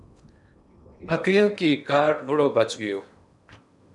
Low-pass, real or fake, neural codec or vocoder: 10.8 kHz; fake; codec, 16 kHz in and 24 kHz out, 0.8 kbps, FocalCodec, streaming, 65536 codes